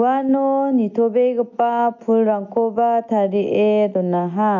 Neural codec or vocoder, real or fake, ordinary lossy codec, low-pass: none; real; none; 7.2 kHz